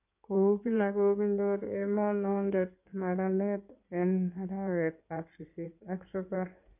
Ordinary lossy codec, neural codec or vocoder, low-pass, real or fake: none; codec, 16 kHz in and 24 kHz out, 2.2 kbps, FireRedTTS-2 codec; 3.6 kHz; fake